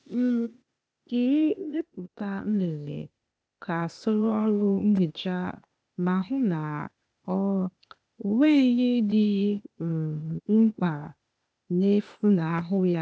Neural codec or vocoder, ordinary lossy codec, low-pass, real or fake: codec, 16 kHz, 0.8 kbps, ZipCodec; none; none; fake